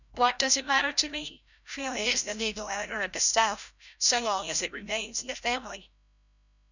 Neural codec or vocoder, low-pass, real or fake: codec, 16 kHz, 0.5 kbps, FreqCodec, larger model; 7.2 kHz; fake